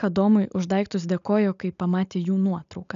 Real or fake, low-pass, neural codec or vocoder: real; 7.2 kHz; none